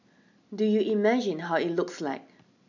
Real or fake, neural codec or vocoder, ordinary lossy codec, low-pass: real; none; none; 7.2 kHz